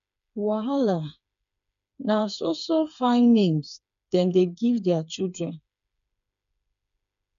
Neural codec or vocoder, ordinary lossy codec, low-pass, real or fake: codec, 16 kHz, 4 kbps, FreqCodec, smaller model; none; 7.2 kHz; fake